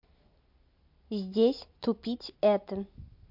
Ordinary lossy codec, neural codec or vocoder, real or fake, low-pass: MP3, 48 kbps; none; real; 5.4 kHz